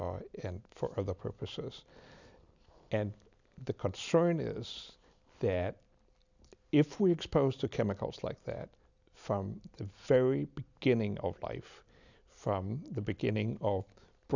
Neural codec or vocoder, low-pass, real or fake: none; 7.2 kHz; real